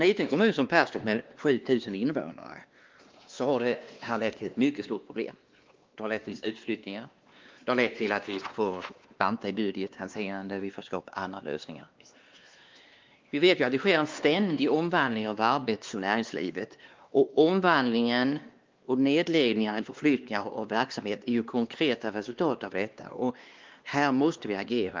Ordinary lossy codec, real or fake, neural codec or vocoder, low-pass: Opus, 32 kbps; fake; codec, 16 kHz, 2 kbps, X-Codec, WavLM features, trained on Multilingual LibriSpeech; 7.2 kHz